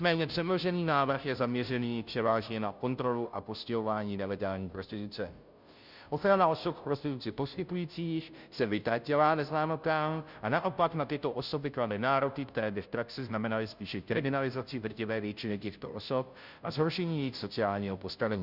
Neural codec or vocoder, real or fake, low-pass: codec, 16 kHz, 0.5 kbps, FunCodec, trained on Chinese and English, 25 frames a second; fake; 5.4 kHz